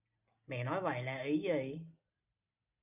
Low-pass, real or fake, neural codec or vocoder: 3.6 kHz; real; none